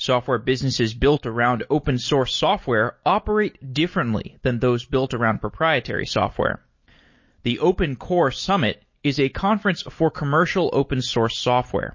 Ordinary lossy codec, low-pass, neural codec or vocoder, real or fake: MP3, 32 kbps; 7.2 kHz; none; real